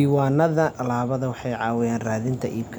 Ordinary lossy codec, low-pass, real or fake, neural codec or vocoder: none; none; real; none